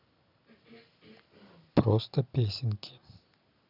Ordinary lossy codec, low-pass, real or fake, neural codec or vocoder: none; 5.4 kHz; real; none